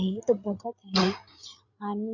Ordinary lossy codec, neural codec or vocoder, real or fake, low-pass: none; codec, 16 kHz, 8 kbps, FreqCodec, larger model; fake; 7.2 kHz